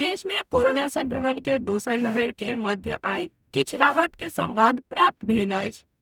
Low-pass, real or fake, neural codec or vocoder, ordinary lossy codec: 19.8 kHz; fake; codec, 44.1 kHz, 0.9 kbps, DAC; none